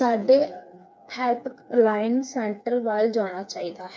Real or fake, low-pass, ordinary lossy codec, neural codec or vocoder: fake; none; none; codec, 16 kHz, 4 kbps, FreqCodec, smaller model